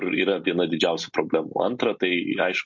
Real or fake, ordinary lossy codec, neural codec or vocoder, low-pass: real; MP3, 48 kbps; none; 7.2 kHz